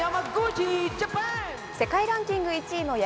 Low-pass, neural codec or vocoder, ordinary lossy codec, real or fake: none; none; none; real